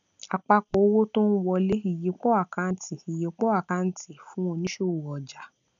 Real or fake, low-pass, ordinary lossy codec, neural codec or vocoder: real; 7.2 kHz; none; none